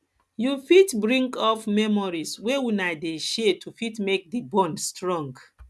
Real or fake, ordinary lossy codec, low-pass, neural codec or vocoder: real; none; none; none